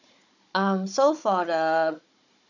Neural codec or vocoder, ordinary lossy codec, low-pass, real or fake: codec, 16 kHz, 16 kbps, FunCodec, trained on Chinese and English, 50 frames a second; none; 7.2 kHz; fake